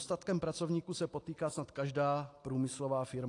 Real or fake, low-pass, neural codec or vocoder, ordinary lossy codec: real; 10.8 kHz; none; AAC, 48 kbps